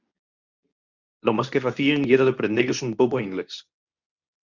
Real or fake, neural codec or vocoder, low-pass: fake; codec, 24 kHz, 0.9 kbps, WavTokenizer, medium speech release version 2; 7.2 kHz